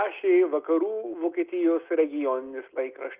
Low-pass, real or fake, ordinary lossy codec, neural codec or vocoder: 3.6 kHz; real; Opus, 24 kbps; none